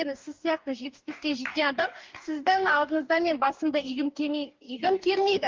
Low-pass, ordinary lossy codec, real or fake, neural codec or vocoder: 7.2 kHz; Opus, 32 kbps; fake; codec, 44.1 kHz, 2.6 kbps, DAC